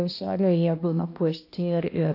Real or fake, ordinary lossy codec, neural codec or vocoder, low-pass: fake; AAC, 32 kbps; codec, 16 kHz, 1 kbps, X-Codec, HuBERT features, trained on balanced general audio; 5.4 kHz